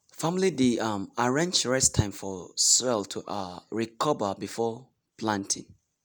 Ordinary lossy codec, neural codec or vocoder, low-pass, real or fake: none; none; none; real